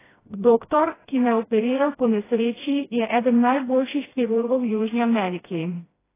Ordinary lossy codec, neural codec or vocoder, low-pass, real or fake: AAC, 16 kbps; codec, 16 kHz, 1 kbps, FreqCodec, smaller model; 3.6 kHz; fake